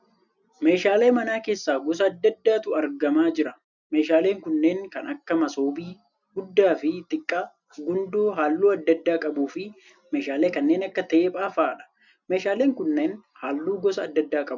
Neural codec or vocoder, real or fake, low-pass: none; real; 7.2 kHz